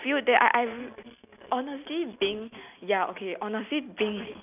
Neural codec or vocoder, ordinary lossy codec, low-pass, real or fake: none; none; 3.6 kHz; real